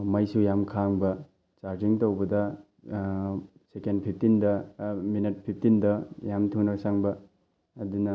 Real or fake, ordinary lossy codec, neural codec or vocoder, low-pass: real; none; none; none